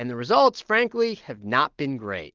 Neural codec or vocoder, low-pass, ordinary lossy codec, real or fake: none; 7.2 kHz; Opus, 16 kbps; real